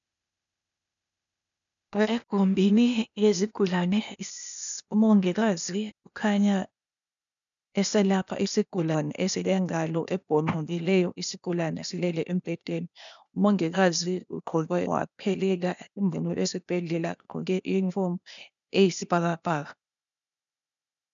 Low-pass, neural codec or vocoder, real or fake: 7.2 kHz; codec, 16 kHz, 0.8 kbps, ZipCodec; fake